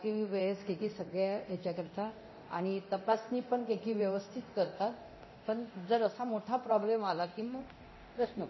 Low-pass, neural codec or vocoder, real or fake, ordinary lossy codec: 7.2 kHz; codec, 24 kHz, 0.9 kbps, DualCodec; fake; MP3, 24 kbps